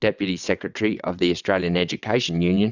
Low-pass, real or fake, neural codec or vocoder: 7.2 kHz; fake; vocoder, 22.05 kHz, 80 mel bands, WaveNeXt